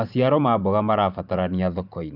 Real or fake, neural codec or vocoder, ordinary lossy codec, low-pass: fake; vocoder, 44.1 kHz, 128 mel bands every 512 samples, BigVGAN v2; none; 5.4 kHz